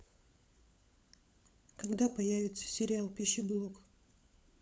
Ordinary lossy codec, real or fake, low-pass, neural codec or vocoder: none; fake; none; codec, 16 kHz, 16 kbps, FunCodec, trained on LibriTTS, 50 frames a second